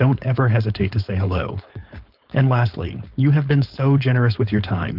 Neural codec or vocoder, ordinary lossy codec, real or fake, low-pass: codec, 16 kHz, 4.8 kbps, FACodec; Opus, 32 kbps; fake; 5.4 kHz